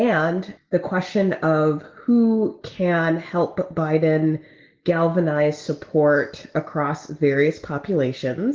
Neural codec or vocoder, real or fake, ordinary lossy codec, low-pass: none; real; Opus, 16 kbps; 7.2 kHz